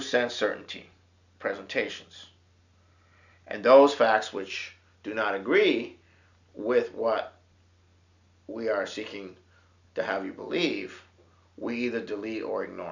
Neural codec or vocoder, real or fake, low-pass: none; real; 7.2 kHz